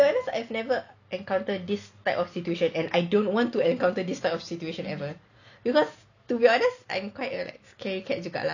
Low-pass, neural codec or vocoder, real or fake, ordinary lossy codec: 7.2 kHz; none; real; none